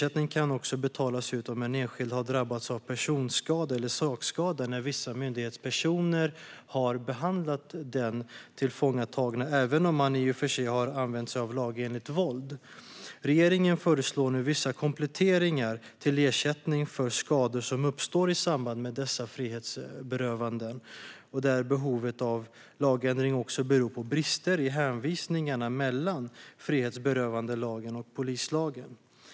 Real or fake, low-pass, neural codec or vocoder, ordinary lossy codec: real; none; none; none